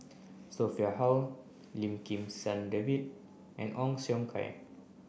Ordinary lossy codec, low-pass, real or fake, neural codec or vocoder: none; none; real; none